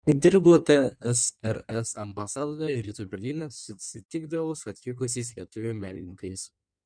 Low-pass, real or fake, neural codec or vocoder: 9.9 kHz; fake; codec, 16 kHz in and 24 kHz out, 1.1 kbps, FireRedTTS-2 codec